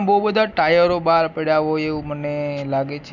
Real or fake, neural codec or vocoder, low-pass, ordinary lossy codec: real; none; 7.2 kHz; none